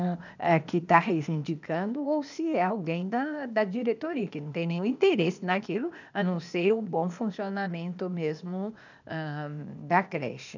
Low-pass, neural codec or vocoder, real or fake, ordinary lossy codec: 7.2 kHz; codec, 16 kHz, 0.8 kbps, ZipCodec; fake; none